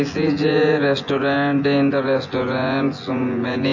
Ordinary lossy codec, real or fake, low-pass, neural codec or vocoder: AAC, 48 kbps; fake; 7.2 kHz; vocoder, 24 kHz, 100 mel bands, Vocos